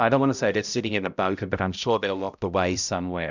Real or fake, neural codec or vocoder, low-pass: fake; codec, 16 kHz, 0.5 kbps, X-Codec, HuBERT features, trained on balanced general audio; 7.2 kHz